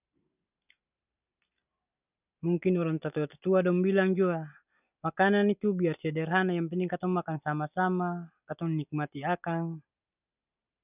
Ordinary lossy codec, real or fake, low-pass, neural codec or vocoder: none; real; 3.6 kHz; none